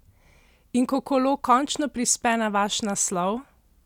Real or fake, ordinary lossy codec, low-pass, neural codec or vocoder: real; none; 19.8 kHz; none